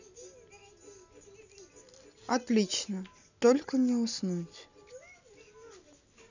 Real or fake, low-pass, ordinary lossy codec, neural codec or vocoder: real; 7.2 kHz; none; none